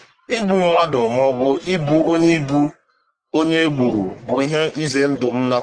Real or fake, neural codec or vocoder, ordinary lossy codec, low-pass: fake; codec, 44.1 kHz, 1.7 kbps, Pupu-Codec; Opus, 24 kbps; 9.9 kHz